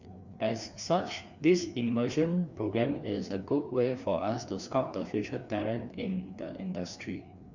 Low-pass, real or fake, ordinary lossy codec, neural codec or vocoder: 7.2 kHz; fake; none; codec, 16 kHz, 2 kbps, FreqCodec, larger model